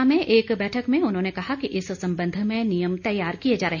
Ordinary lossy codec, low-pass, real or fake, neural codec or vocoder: none; 7.2 kHz; real; none